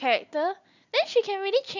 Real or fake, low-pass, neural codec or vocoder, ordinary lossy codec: real; 7.2 kHz; none; none